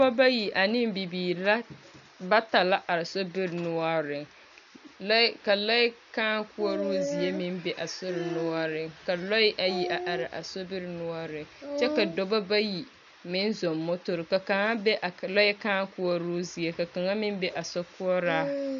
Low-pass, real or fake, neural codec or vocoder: 7.2 kHz; real; none